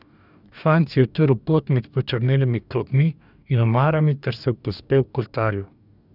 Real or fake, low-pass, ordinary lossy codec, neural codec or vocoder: fake; 5.4 kHz; none; codec, 44.1 kHz, 2.6 kbps, DAC